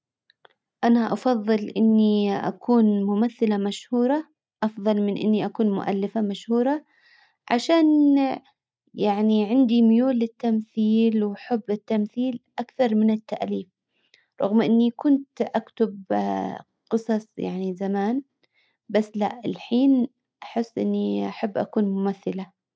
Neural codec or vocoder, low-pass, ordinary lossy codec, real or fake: none; none; none; real